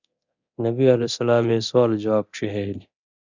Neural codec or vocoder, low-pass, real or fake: codec, 24 kHz, 0.9 kbps, DualCodec; 7.2 kHz; fake